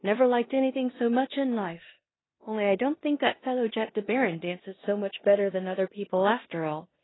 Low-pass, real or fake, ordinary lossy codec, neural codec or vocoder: 7.2 kHz; fake; AAC, 16 kbps; codec, 16 kHz in and 24 kHz out, 0.9 kbps, LongCat-Audio-Codec, four codebook decoder